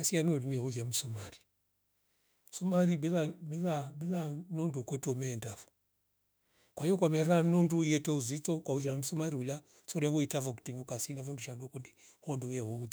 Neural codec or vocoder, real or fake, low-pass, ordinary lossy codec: autoencoder, 48 kHz, 32 numbers a frame, DAC-VAE, trained on Japanese speech; fake; none; none